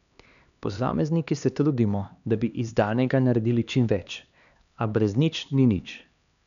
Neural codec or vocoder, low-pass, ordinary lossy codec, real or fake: codec, 16 kHz, 2 kbps, X-Codec, HuBERT features, trained on LibriSpeech; 7.2 kHz; none; fake